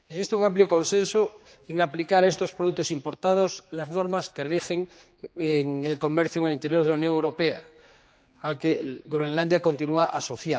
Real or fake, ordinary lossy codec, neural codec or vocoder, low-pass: fake; none; codec, 16 kHz, 2 kbps, X-Codec, HuBERT features, trained on general audio; none